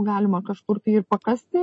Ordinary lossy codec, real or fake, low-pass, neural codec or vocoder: MP3, 32 kbps; real; 9.9 kHz; none